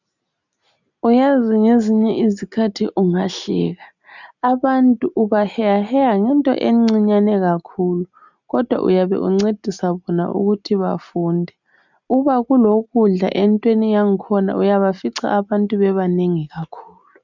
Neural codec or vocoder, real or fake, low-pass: none; real; 7.2 kHz